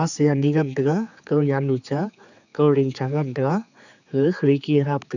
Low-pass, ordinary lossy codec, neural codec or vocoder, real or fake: 7.2 kHz; none; codec, 16 kHz, 4 kbps, X-Codec, HuBERT features, trained on general audio; fake